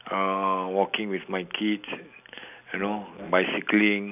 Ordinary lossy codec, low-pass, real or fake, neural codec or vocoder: none; 3.6 kHz; real; none